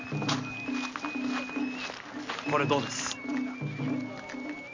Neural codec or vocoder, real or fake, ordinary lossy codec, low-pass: none; real; MP3, 48 kbps; 7.2 kHz